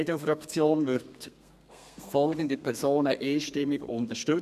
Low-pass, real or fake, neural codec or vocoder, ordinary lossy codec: 14.4 kHz; fake; codec, 32 kHz, 1.9 kbps, SNAC; none